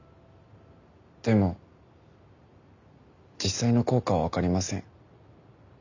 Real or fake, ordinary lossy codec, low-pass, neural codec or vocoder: real; none; 7.2 kHz; none